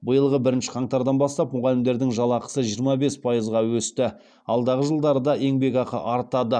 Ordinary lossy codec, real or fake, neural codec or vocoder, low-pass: none; real; none; 9.9 kHz